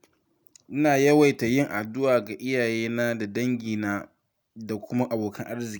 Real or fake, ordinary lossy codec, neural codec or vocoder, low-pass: real; none; none; none